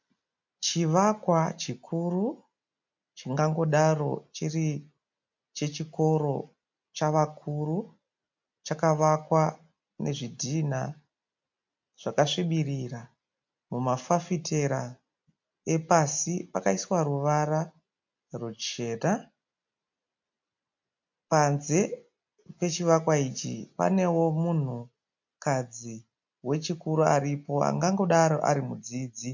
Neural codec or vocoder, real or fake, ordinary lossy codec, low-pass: none; real; MP3, 48 kbps; 7.2 kHz